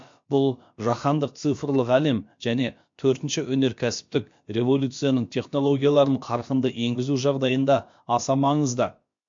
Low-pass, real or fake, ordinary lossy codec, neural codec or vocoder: 7.2 kHz; fake; MP3, 48 kbps; codec, 16 kHz, about 1 kbps, DyCAST, with the encoder's durations